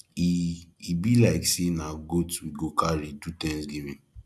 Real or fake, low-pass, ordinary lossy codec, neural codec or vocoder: real; none; none; none